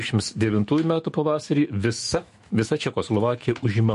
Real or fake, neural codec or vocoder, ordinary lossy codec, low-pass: fake; autoencoder, 48 kHz, 128 numbers a frame, DAC-VAE, trained on Japanese speech; MP3, 48 kbps; 14.4 kHz